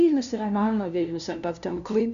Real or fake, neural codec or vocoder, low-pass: fake; codec, 16 kHz, 0.5 kbps, FunCodec, trained on LibriTTS, 25 frames a second; 7.2 kHz